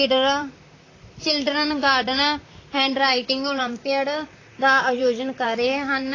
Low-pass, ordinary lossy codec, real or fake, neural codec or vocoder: 7.2 kHz; AAC, 32 kbps; fake; vocoder, 44.1 kHz, 128 mel bands, Pupu-Vocoder